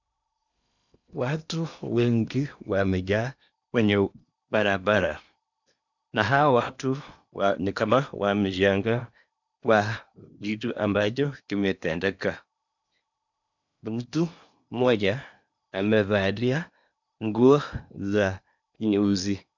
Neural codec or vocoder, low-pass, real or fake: codec, 16 kHz in and 24 kHz out, 0.8 kbps, FocalCodec, streaming, 65536 codes; 7.2 kHz; fake